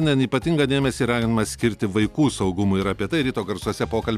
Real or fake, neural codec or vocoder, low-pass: real; none; 14.4 kHz